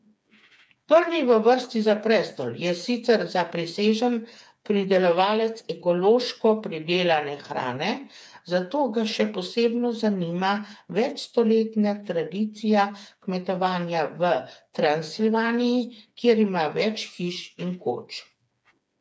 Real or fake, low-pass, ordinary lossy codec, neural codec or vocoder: fake; none; none; codec, 16 kHz, 4 kbps, FreqCodec, smaller model